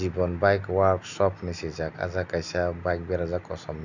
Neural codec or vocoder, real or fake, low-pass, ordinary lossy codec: none; real; 7.2 kHz; none